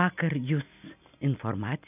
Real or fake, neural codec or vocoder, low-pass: real; none; 3.6 kHz